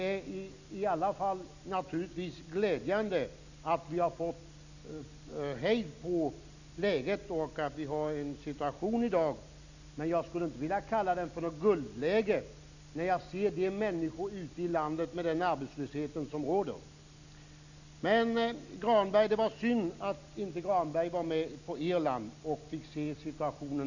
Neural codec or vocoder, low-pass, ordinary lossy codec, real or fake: none; 7.2 kHz; none; real